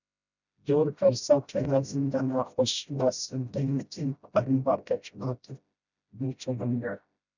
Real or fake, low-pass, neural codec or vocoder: fake; 7.2 kHz; codec, 16 kHz, 0.5 kbps, FreqCodec, smaller model